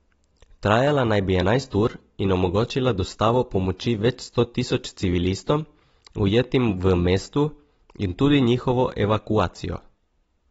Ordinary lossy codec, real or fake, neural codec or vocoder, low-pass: AAC, 24 kbps; real; none; 19.8 kHz